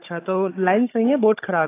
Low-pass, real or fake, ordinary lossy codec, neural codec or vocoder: 3.6 kHz; fake; AAC, 24 kbps; codec, 16 kHz, 16 kbps, FunCodec, trained on Chinese and English, 50 frames a second